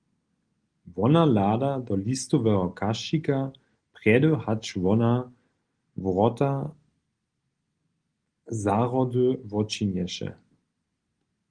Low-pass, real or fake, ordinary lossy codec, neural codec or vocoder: 9.9 kHz; real; Opus, 24 kbps; none